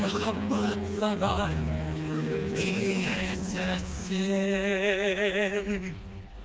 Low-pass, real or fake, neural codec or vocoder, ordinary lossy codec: none; fake; codec, 16 kHz, 2 kbps, FreqCodec, smaller model; none